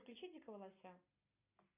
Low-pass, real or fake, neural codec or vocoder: 3.6 kHz; real; none